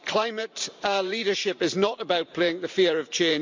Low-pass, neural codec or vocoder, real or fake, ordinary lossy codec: 7.2 kHz; vocoder, 44.1 kHz, 128 mel bands every 512 samples, BigVGAN v2; fake; MP3, 64 kbps